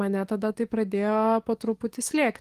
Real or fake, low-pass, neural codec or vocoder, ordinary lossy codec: fake; 14.4 kHz; codec, 44.1 kHz, 7.8 kbps, DAC; Opus, 24 kbps